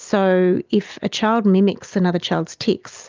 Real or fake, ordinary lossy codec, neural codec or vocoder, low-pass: real; Opus, 24 kbps; none; 7.2 kHz